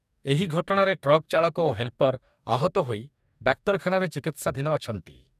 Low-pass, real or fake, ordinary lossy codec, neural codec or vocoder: 14.4 kHz; fake; none; codec, 44.1 kHz, 2.6 kbps, DAC